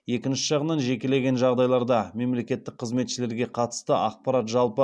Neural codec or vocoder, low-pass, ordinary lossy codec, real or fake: none; none; none; real